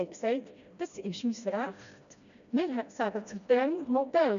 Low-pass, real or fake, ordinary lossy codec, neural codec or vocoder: 7.2 kHz; fake; none; codec, 16 kHz, 1 kbps, FreqCodec, smaller model